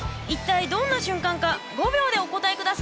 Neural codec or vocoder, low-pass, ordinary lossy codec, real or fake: none; none; none; real